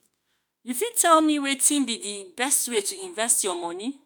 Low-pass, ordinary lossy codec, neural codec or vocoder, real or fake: none; none; autoencoder, 48 kHz, 32 numbers a frame, DAC-VAE, trained on Japanese speech; fake